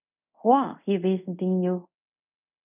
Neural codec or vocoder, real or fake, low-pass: codec, 24 kHz, 0.5 kbps, DualCodec; fake; 3.6 kHz